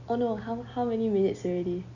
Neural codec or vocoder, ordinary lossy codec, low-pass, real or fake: none; none; 7.2 kHz; real